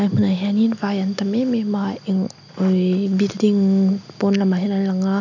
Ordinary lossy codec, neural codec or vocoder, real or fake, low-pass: none; none; real; 7.2 kHz